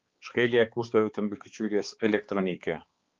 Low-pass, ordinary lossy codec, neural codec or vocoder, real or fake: 7.2 kHz; Opus, 32 kbps; codec, 16 kHz, 2 kbps, X-Codec, HuBERT features, trained on balanced general audio; fake